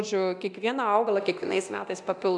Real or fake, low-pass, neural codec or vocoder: fake; 10.8 kHz; codec, 24 kHz, 0.9 kbps, DualCodec